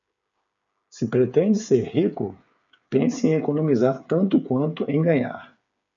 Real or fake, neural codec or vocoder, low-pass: fake; codec, 16 kHz, 8 kbps, FreqCodec, smaller model; 7.2 kHz